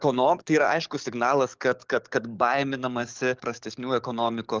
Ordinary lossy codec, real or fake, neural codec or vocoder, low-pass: Opus, 32 kbps; fake; codec, 44.1 kHz, 7.8 kbps, DAC; 7.2 kHz